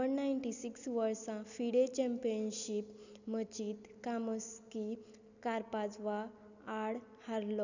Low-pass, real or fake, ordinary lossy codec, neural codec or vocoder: 7.2 kHz; real; none; none